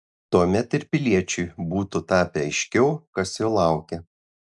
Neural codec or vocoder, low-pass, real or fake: none; 10.8 kHz; real